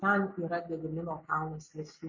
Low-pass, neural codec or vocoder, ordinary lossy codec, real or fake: 7.2 kHz; none; MP3, 32 kbps; real